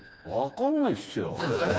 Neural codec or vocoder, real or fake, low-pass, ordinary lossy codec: codec, 16 kHz, 2 kbps, FreqCodec, smaller model; fake; none; none